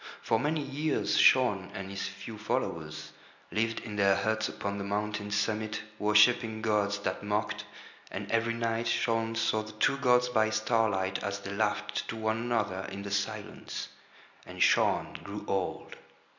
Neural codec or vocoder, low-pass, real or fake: none; 7.2 kHz; real